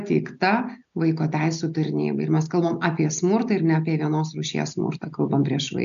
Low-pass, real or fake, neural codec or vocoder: 7.2 kHz; real; none